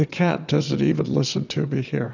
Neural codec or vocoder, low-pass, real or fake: vocoder, 22.05 kHz, 80 mel bands, WaveNeXt; 7.2 kHz; fake